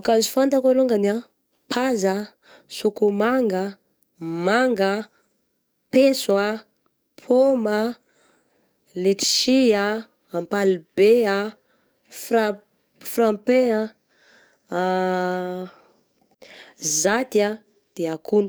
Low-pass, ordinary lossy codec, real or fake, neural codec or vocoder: none; none; fake; codec, 44.1 kHz, 7.8 kbps, DAC